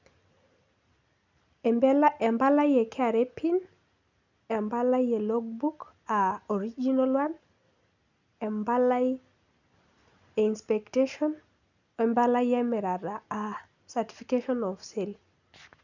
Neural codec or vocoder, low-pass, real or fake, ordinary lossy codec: none; 7.2 kHz; real; none